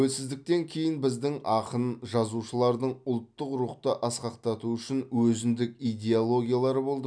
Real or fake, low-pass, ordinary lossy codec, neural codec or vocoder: real; 9.9 kHz; none; none